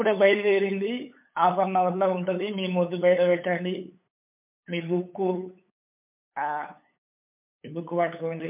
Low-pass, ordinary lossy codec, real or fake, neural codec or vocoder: 3.6 kHz; MP3, 32 kbps; fake; codec, 16 kHz, 8 kbps, FunCodec, trained on LibriTTS, 25 frames a second